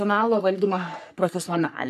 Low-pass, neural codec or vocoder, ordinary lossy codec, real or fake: 14.4 kHz; codec, 44.1 kHz, 3.4 kbps, Pupu-Codec; MP3, 96 kbps; fake